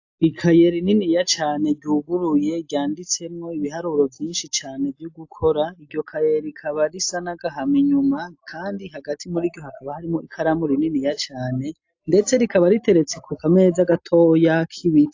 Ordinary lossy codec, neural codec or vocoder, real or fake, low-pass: AAC, 48 kbps; none; real; 7.2 kHz